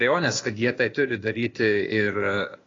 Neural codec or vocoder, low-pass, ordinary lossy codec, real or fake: codec, 16 kHz, 0.8 kbps, ZipCodec; 7.2 kHz; AAC, 32 kbps; fake